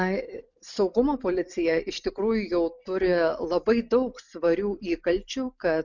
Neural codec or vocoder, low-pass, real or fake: none; 7.2 kHz; real